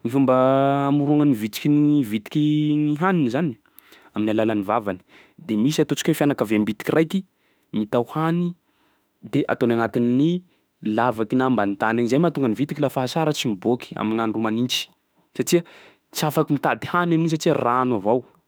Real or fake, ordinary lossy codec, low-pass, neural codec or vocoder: fake; none; none; autoencoder, 48 kHz, 32 numbers a frame, DAC-VAE, trained on Japanese speech